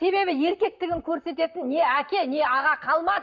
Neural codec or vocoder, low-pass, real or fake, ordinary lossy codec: vocoder, 44.1 kHz, 128 mel bands, Pupu-Vocoder; 7.2 kHz; fake; none